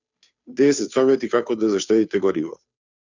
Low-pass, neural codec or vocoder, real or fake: 7.2 kHz; codec, 16 kHz, 2 kbps, FunCodec, trained on Chinese and English, 25 frames a second; fake